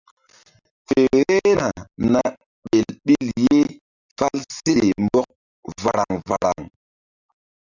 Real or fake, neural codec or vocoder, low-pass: real; none; 7.2 kHz